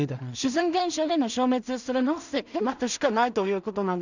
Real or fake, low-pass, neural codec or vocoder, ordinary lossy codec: fake; 7.2 kHz; codec, 16 kHz in and 24 kHz out, 0.4 kbps, LongCat-Audio-Codec, two codebook decoder; none